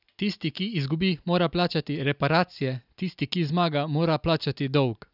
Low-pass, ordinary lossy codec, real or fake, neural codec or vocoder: 5.4 kHz; none; real; none